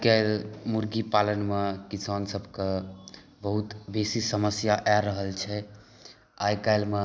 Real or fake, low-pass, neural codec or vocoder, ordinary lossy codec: real; none; none; none